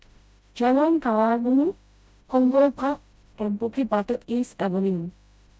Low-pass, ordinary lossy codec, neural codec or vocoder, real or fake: none; none; codec, 16 kHz, 0.5 kbps, FreqCodec, smaller model; fake